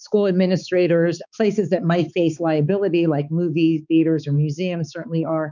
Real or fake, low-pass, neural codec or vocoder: fake; 7.2 kHz; codec, 16 kHz, 4 kbps, X-Codec, HuBERT features, trained on balanced general audio